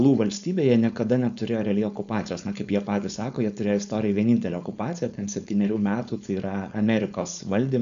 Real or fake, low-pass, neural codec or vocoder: fake; 7.2 kHz; codec, 16 kHz, 4.8 kbps, FACodec